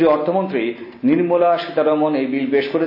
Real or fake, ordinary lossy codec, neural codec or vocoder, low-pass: real; AAC, 32 kbps; none; 5.4 kHz